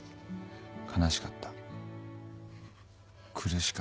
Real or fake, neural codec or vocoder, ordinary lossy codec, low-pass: real; none; none; none